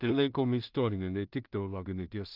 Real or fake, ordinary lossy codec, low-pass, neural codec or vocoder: fake; Opus, 24 kbps; 5.4 kHz; codec, 16 kHz in and 24 kHz out, 0.4 kbps, LongCat-Audio-Codec, two codebook decoder